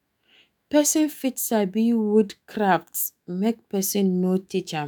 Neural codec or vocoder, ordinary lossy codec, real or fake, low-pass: autoencoder, 48 kHz, 128 numbers a frame, DAC-VAE, trained on Japanese speech; none; fake; none